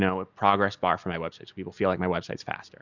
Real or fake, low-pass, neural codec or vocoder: real; 7.2 kHz; none